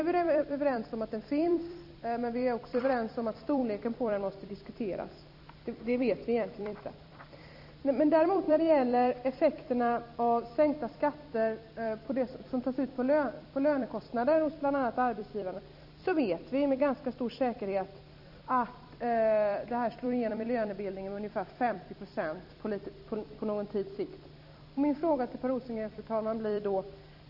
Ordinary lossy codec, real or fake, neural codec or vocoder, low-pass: none; fake; vocoder, 44.1 kHz, 128 mel bands every 256 samples, BigVGAN v2; 5.4 kHz